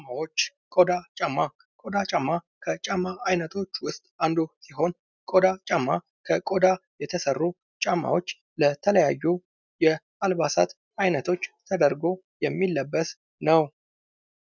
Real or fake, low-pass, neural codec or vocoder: real; 7.2 kHz; none